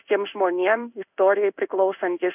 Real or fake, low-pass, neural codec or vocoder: fake; 3.6 kHz; codec, 16 kHz in and 24 kHz out, 1 kbps, XY-Tokenizer